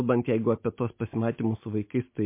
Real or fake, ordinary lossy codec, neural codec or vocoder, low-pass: real; MP3, 24 kbps; none; 3.6 kHz